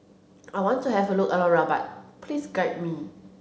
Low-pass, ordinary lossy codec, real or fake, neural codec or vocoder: none; none; real; none